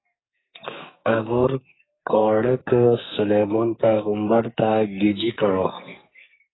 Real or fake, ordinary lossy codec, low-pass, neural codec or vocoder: fake; AAC, 16 kbps; 7.2 kHz; codec, 44.1 kHz, 2.6 kbps, SNAC